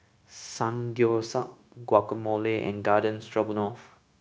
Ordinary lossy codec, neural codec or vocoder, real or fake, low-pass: none; codec, 16 kHz, 0.9 kbps, LongCat-Audio-Codec; fake; none